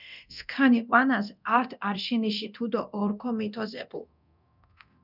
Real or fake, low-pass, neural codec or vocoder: fake; 5.4 kHz; codec, 24 kHz, 0.9 kbps, DualCodec